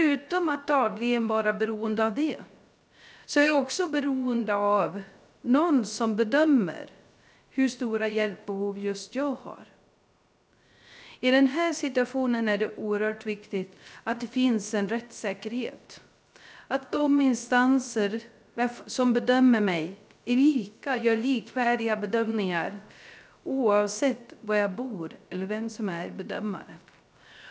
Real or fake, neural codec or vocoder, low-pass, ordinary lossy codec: fake; codec, 16 kHz, 0.3 kbps, FocalCodec; none; none